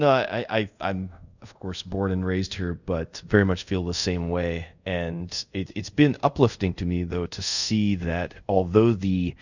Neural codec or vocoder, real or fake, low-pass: codec, 24 kHz, 0.5 kbps, DualCodec; fake; 7.2 kHz